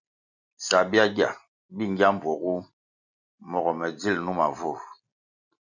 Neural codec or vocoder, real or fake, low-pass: none; real; 7.2 kHz